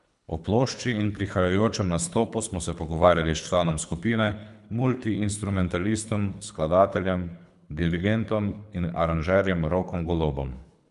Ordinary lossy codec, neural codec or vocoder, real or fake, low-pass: none; codec, 24 kHz, 3 kbps, HILCodec; fake; 10.8 kHz